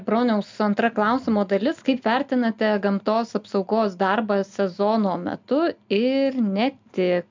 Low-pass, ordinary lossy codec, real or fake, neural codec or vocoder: 7.2 kHz; MP3, 64 kbps; real; none